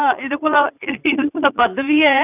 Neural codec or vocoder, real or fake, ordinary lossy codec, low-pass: vocoder, 44.1 kHz, 80 mel bands, Vocos; fake; none; 3.6 kHz